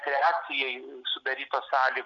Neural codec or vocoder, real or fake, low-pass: none; real; 7.2 kHz